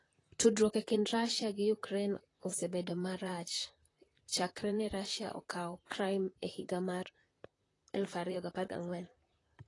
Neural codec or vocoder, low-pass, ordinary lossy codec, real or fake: vocoder, 44.1 kHz, 128 mel bands, Pupu-Vocoder; 10.8 kHz; AAC, 32 kbps; fake